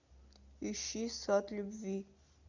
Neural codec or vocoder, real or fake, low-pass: none; real; 7.2 kHz